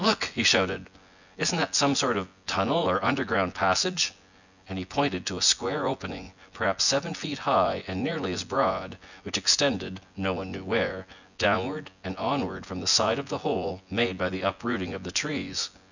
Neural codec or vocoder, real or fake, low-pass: vocoder, 24 kHz, 100 mel bands, Vocos; fake; 7.2 kHz